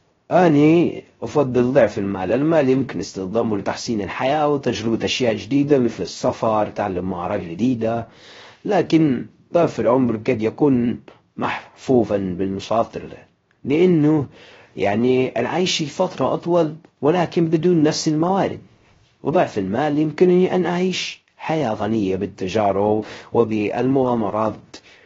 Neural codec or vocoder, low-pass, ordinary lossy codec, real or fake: codec, 16 kHz, 0.3 kbps, FocalCodec; 7.2 kHz; AAC, 24 kbps; fake